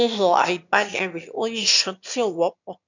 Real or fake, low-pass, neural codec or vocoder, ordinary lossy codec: fake; 7.2 kHz; autoencoder, 22.05 kHz, a latent of 192 numbers a frame, VITS, trained on one speaker; none